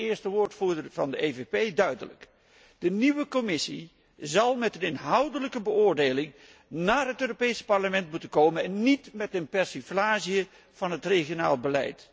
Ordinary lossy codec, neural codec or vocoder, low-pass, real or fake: none; none; none; real